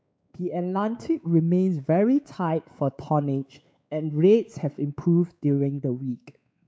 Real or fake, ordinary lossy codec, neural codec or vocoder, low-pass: fake; none; codec, 16 kHz, 4 kbps, X-Codec, WavLM features, trained on Multilingual LibriSpeech; none